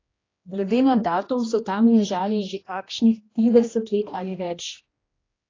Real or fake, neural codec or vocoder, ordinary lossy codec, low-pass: fake; codec, 16 kHz, 1 kbps, X-Codec, HuBERT features, trained on general audio; AAC, 32 kbps; 7.2 kHz